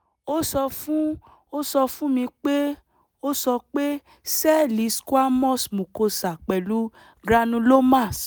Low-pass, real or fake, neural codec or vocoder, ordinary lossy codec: none; real; none; none